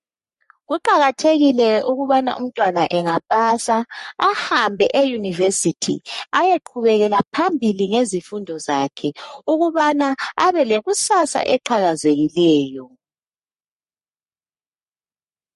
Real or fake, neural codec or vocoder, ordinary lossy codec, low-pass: fake; codec, 44.1 kHz, 3.4 kbps, Pupu-Codec; MP3, 48 kbps; 14.4 kHz